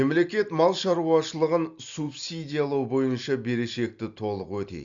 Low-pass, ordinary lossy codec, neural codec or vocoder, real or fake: 7.2 kHz; Opus, 64 kbps; none; real